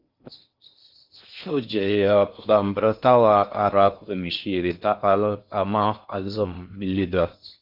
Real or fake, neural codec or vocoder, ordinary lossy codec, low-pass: fake; codec, 16 kHz in and 24 kHz out, 0.6 kbps, FocalCodec, streaming, 4096 codes; Opus, 24 kbps; 5.4 kHz